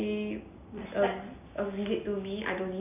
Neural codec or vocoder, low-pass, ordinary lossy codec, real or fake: none; 3.6 kHz; none; real